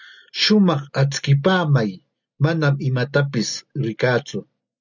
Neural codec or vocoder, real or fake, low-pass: none; real; 7.2 kHz